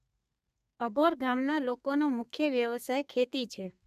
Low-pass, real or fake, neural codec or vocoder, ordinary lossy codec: 14.4 kHz; fake; codec, 32 kHz, 1.9 kbps, SNAC; none